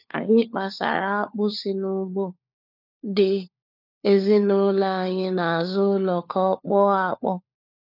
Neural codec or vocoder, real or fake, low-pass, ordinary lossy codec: codec, 16 kHz, 4 kbps, FunCodec, trained on LibriTTS, 50 frames a second; fake; 5.4 kHz; none